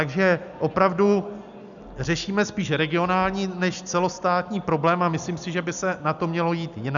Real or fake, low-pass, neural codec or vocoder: real; 7.2 kHz; none